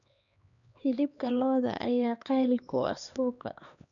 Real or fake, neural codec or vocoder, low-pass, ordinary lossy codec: fake; codec, 16 kHz, 2 kbps, X-Codec, HuBERT features, trained on LibriSpeech; 7.2 kHz; none